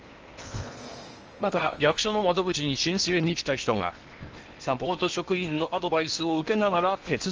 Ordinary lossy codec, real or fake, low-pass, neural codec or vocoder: Opus, 24 kbps; fake; 7.2 kHz; codec, 16 kHz in and 24 kHz out, 0.8 kbps, FocalCodec, streaming, 65536 codes